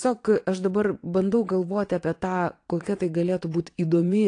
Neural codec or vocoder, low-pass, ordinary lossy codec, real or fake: vocoder, 22.05 kHz, 80 mel bands, WaveNeXt; 9.9 kHz; AAC, 48 kbps; fake